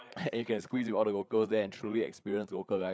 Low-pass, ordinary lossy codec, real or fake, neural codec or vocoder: none; none; fake; codec, 16 kHz, 8 kbps, FreqCodec, larger model